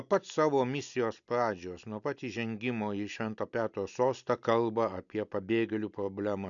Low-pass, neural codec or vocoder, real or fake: 7.2 kHz; none; real